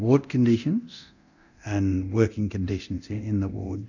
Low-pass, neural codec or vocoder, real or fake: 7.2 kHz; codec, 24 kHz, 0.9 kbps, DualCodec; fake